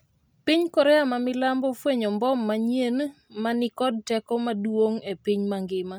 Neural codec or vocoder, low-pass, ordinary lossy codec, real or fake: none; none; none; real